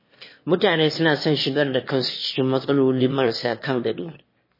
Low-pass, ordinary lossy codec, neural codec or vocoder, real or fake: 5.4 kHz; MP3, 24 kbps; autoencoder, 22.05 kHz, a latent of 192 numbers a frame, VITS, trained on one speaker; fake